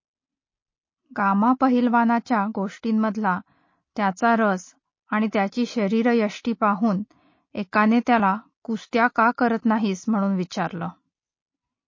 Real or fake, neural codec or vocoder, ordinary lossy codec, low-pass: real; none; MP3, 32 kbps; 7.2 kHz